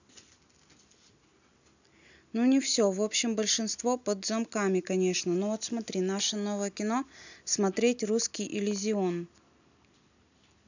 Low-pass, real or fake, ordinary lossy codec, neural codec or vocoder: 7.2 kHz; real; none; none